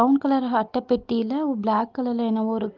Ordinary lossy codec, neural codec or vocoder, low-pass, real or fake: Opus, 32 kbps; none; 7.2 kHz; real